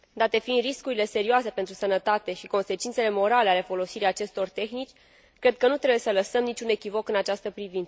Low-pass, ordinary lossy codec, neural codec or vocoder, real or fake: none; none; none; real